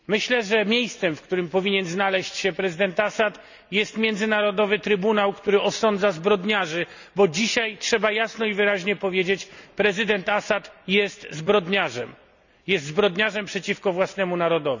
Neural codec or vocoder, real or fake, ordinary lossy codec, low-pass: none; real; none; 7.2 kHz